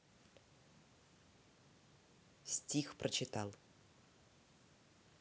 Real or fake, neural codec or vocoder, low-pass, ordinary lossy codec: real; none; none; none